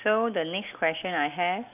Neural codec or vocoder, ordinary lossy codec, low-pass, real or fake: none; none; 3.6 kHz; real